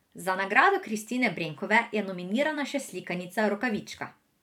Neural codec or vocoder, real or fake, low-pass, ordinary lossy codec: vocoder, 44.1 kHz, 128 mel bands every 512 samples, BigVGAN v2; fake; 19.8 kHz; none